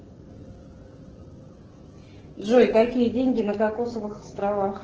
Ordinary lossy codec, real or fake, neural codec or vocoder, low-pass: Opus, 16 kbps; fake; codec, 44.1 kHz, 7.8 kbps, DAC; 7.2 kHz